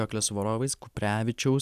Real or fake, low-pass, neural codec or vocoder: real; 14.4 kHz; none